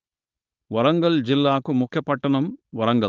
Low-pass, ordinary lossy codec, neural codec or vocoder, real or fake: 7.2 kHz; Opus, 24 kbps; codec, 16 kHz, 4.8 kbps, FACodec; fake